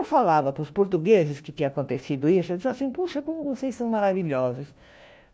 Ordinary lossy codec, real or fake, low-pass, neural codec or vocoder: none; fake; none; codec, 16 kHz, 1 kbps, FunCodec, trained on LibriTTS, 50 frames a second